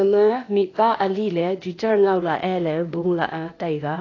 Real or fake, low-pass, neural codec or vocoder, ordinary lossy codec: fake; 7.2 kHz; codec, 16 kHz, 0.8 kbps, ZipCodec; AAC, 32 kbps